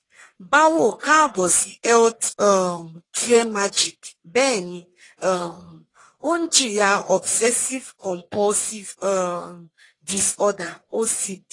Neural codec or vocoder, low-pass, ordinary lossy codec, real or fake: codec, 44.1 kHz, 1.7 kbps, Pupu-Codec; 10.8 kHz; AAC, 32 kbps; fake